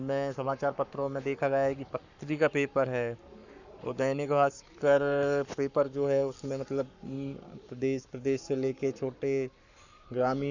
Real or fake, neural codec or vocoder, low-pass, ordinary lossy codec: fake; codec, 44.1 kHz, 7.8 kbps, Pupu-Codec; 7.2 kHz; none